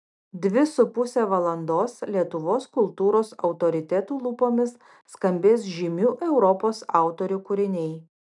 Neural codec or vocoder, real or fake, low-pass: none; real; 10.8 kHz